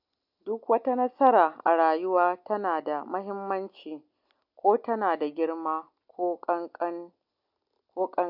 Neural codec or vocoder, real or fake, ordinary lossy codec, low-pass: none; real; AAC, 48 kbps; 5.4 kHz